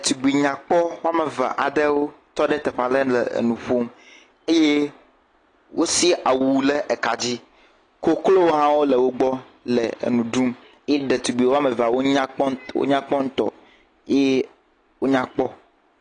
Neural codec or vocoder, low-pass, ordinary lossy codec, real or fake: none; 9.9 kHz; AAC, 32 kbps; real